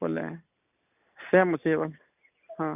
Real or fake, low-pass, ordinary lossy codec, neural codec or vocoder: real; 3.6 kHz; none; none